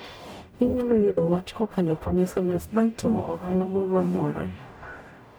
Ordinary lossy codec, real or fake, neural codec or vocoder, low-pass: none; fake; codec, 44.1 kHz, 0.9 kbps, DAC; none